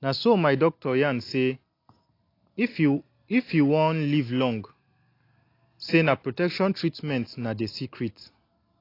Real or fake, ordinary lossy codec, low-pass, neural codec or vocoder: real; AAC, 32 kbps; 5.4 kHz; none